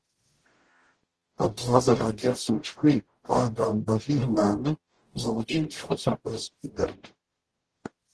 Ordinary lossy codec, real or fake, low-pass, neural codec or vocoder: Opus, 16 kbps; fake; 10.8 kHz; codec, 44.1 kHz, 0.9 kbps, DAC